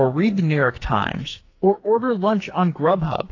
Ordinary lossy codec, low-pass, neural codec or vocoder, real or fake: AAC, 32 kbps; 7.2 kHz; codec, 44.1 kHz, 2.6 kbps, SNAC; fake